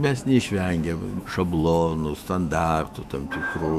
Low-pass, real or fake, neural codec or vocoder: 14.4 kHz; fake; autoencoder, 48 kHz, 128 numbers a frame, DAC-VAE, trained on Japanese speech